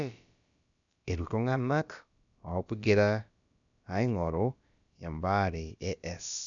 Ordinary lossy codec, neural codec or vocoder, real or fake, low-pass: none; codec, 16 kHz, about 1 kbps, DyCAST, with the encoder's durations; fake; 7.2 kHz